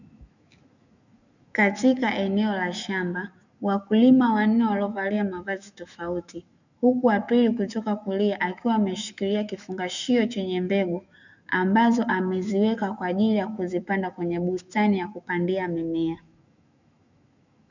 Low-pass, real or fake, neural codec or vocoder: 7.2 kHz; fake; autoencoder, 48 kHz, 128 numbers a frame, DAC-VAE, trained on Japanese speech